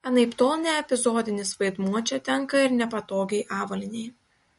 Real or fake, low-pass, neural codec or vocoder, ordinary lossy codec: real; 19.8 kHz; none; MP3, 48 kbps